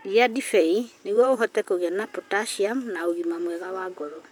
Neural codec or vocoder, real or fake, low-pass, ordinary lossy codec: vocoder, 44.1 kHz, 128 mel bands, Pupu-Vocoder; fake; 19.8 kHz; none